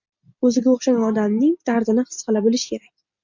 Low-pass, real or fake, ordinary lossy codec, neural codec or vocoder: 7.2 kHz; real; MP3, 64 kbps; none